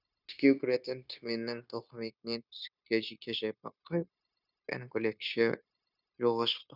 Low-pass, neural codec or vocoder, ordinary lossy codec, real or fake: 5.4 kHz; codec, 16 kHz, 0.9 kbps, LongCat-Audio-Codec; none; fake